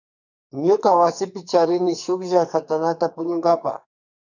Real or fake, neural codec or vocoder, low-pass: fake; codec, 44.1 kHz, 2.6 kbps, SNAC; 7.2 kHz